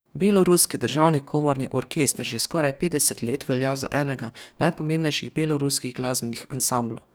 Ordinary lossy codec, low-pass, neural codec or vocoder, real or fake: none; none; codec, 44.1 kHz, 2.6 kbps, DAC; fake